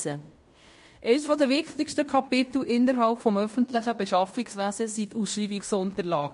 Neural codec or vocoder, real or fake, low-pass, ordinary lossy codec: codec, 16 kHz in and 24 kHz out, 0.9 kbps, LongCat-Audio-Codec, fine tuned four codebook decoder; fake; 10.8 kHz; MP3, 48 kbps